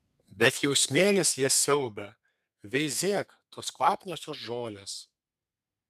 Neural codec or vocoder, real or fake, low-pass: codec, 32 kHz, 1.9 kbps, SNAC; fake; 14.4 kHz